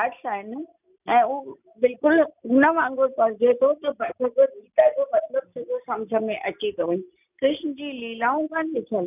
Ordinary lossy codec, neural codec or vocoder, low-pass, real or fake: none; none; 3.6 kHz; real